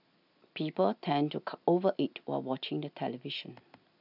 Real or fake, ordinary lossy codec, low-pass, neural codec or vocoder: real; none; 5.4 kHz; none